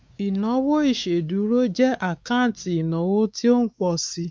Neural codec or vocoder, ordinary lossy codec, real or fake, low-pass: codec, 16 kHz, 4 kbps, X-Codec, WavLM features, trained on Multilingual LibriSpeech; none; fake; none